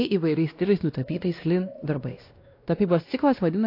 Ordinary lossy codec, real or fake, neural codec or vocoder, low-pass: AAC, 32 kbps; fake; codec, 16 kHz, 1 kbps, X-Codec, WavLM features, trained on Multilingual LibriSpeech; 5.4 kHz